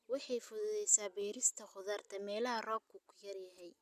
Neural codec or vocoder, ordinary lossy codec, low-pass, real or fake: none; none; 14.4 kHz; real